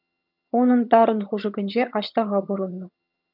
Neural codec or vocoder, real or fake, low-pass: vocoder, 22.05 kHz, 80 mel bands, HiFi-GAN; fake; 5.4 kHz